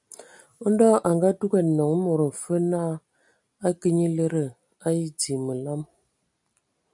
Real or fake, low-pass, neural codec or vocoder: real; 10.8 kHz; none